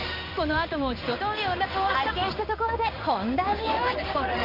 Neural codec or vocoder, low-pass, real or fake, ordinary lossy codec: codec, 16 kHz in and 24 kHz out, 1 kbps, XY-Tokenizer; 5.4 kHz; fake; none